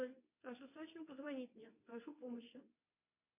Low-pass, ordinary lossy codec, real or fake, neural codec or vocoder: 3.6 kHz; MP3, 24 kbps; fake; codec, 24 kHz, 0.9 kbps, WavTokenizer, medium speech release version 2